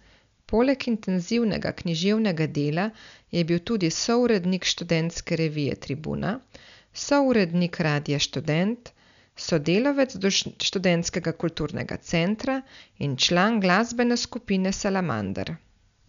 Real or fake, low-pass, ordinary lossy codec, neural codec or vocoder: real; 7.2 kHz; none; none